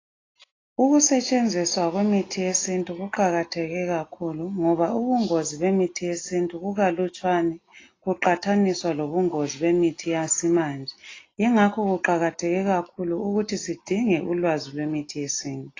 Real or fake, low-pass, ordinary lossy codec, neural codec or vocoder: real; 7.2 kHz; AAC, 32 kbps; none